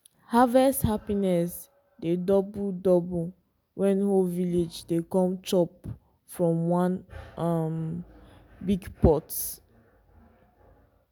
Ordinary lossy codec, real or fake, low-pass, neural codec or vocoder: none; real; none; none